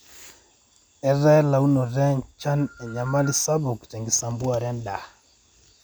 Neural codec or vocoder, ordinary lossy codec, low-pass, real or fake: none; none; none; real